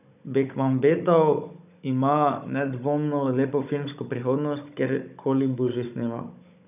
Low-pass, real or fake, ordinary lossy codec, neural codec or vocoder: 3.6 kHz; fake; none; codec, 16 kHz, 16 kbps, FunCodec, trained on Chinese and English, 50 frames a second